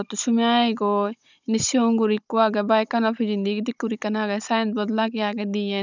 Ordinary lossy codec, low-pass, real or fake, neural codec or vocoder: none; 7.2 kHz; fake; codec, 16 kHz, 16 kbps, FunCodec, trained on Chinese and English, 50 frames a second